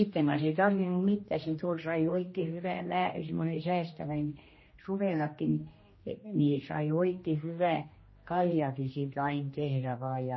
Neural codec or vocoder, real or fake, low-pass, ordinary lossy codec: codec, 16 kHz, 1 kbps, X-Codec, HuBERT features, trained on general audio; fake; 7.2 kHz; MP3, 24 kbps